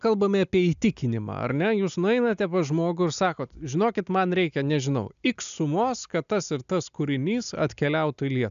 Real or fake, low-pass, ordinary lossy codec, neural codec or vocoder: real; 7.2 kHz; AAC, 96 kbps; none